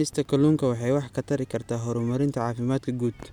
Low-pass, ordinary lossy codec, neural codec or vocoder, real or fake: 19.8 kHz; none; none; real